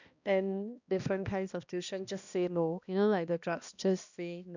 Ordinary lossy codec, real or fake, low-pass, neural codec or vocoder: none; fake; 7.2 kHz; codec, 16 kHz, 1 kbps, X-Codec, HuBERT features, trained on balanced general audio